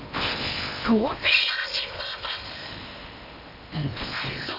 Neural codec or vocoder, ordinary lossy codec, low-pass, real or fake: codec, 16 kHz in and 24 kHz out, 0.8 kbps, FocalCodec, streaming, 65536 codes; none; 5.4 kHz; fake